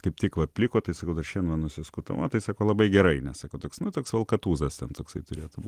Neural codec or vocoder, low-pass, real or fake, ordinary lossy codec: none; 14.4 kHz; real; Opus, 24 kbps